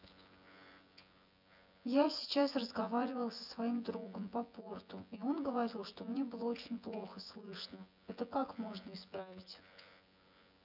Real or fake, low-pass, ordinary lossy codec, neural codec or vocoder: fake; 5.4 kHz; none; vocoder, 24 kHz, 100 mel bands, Vocos